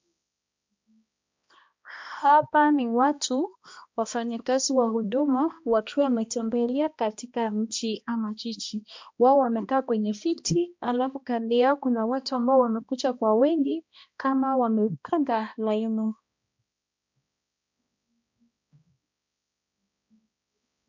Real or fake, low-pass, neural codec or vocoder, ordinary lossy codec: fake; 7.2 kHz; codec, 16 kHz, 1 kbps, X-Codec, HuBERT features, trained on balanced general audio; MP3, 64 kbps